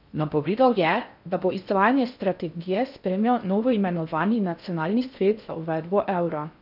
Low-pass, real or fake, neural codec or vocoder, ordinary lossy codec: 5.4 kHz; fake; codec, 16 kHz in and 24 kHz out, 0.6 kbps, FocalCodec, streaming, 4096 codes; none